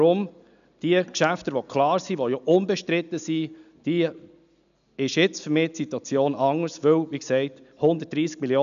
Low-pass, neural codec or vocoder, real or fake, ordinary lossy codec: 7.2 kHz; none; real; none